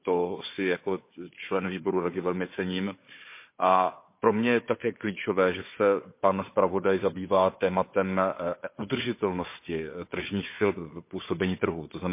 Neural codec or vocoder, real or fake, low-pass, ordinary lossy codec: codec, 16 kHz, 4 kbps, FunCodec, trained on Chinese and English, 50 frames a second; fake; 3.6 kHz; MP3, 24 kbps